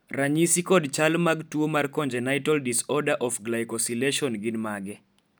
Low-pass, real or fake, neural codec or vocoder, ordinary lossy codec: none; real; none; none